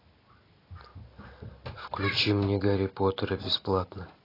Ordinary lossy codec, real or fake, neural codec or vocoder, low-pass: AAC, 24 kbps; real; none; 5.4 kHz